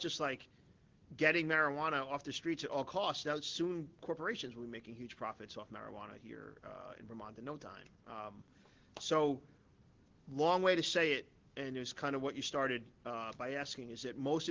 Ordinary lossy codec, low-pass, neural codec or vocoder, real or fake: Opus, 16 kbps; 7.2 kHz; none; real